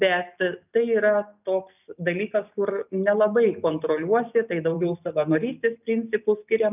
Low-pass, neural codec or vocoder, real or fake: 3.6 kHz; none; real